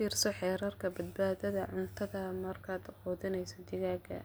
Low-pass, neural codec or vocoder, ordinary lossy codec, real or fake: none; none; none; real